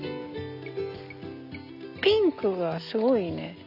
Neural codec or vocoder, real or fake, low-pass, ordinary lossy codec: none; real; 5.4 kHz; none